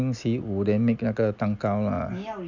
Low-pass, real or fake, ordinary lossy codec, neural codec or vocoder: 7.2 kHz; real; none; none